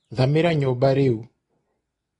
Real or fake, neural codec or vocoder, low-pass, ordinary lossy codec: real; none; 10.8 kHz; AAC, 32 kbps